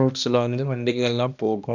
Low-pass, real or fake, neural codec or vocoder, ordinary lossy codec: 7.2 kHz; fake; codec, 16 kHz, 1 kbps, X-Codec, HuBERT features, trained on balanced general audio; none